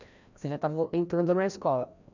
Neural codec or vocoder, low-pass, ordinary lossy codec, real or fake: codec, 16 kHz, 1 kbps, FreqCodec, larger model; 7.2 kHz; none; fake